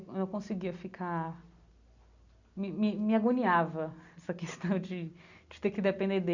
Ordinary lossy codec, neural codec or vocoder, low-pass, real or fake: none; none; 7.2 kHz; real